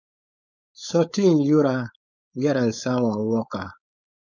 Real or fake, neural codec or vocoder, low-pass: fake; codec, 16 kHz, 4.8 kbps, FACodec; 7.2 kHz